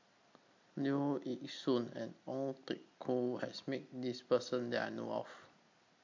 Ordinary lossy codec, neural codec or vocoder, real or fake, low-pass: MP3, 48 kbps; none; real; 7.2 kHz